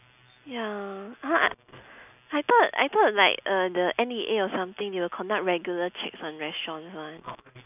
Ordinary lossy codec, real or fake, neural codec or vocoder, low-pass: none; real; none; 3.6 kHz